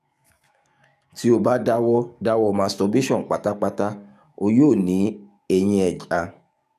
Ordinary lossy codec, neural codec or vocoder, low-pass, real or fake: none; codec, 44.1 kHz, 7.8 kbps, DAC; 14.4 kHz; fake